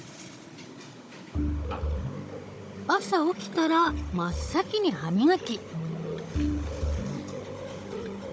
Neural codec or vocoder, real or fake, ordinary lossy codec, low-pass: codec, 16 kHz, 16 kbps, FunCodec, trained on Chinese and English, 50 frames a second; fake; none; none